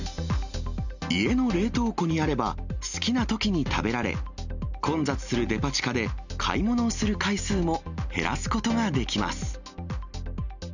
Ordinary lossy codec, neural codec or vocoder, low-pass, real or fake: none; none; 7.2 kHz; real